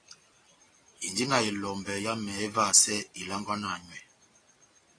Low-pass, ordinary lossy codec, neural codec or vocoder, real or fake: 9.9 kHz; AAC, 32 kbps; none; real